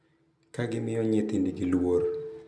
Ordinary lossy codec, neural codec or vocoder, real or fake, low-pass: none; none; real; none